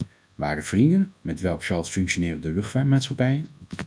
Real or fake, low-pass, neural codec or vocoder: fake; 9.9 kHz; codec, 24 kHz, 0.9 kbps, WavTokenizer, large speech release